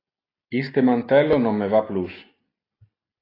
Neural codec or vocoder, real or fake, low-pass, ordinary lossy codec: none; real; 5.4 kHz; AAC, 24 kbps